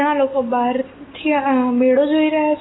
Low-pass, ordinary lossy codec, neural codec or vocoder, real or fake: 7.2 kHz; AAC, 16 kbps; none; real